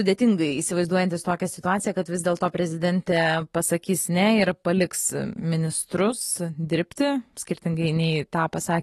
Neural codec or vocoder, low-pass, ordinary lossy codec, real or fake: autoencoder, 48 kHz, 128 numbers a frame, DAC-VAE, trained on Japanese speech; 19.8 kHz; AAC, 32 kbps; fake